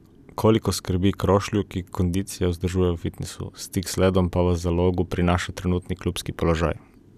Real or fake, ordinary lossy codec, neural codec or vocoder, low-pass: real; none; none; 14.4 kHz